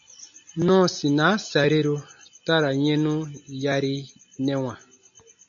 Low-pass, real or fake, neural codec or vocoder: 7.2 kHz; real; none